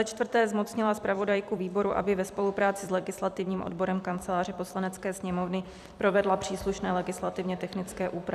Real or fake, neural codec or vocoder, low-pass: fake; vocoder, 44.1 kHz, 128 mel bands every 256 samples, BigVGAN v2; 14.4 kHz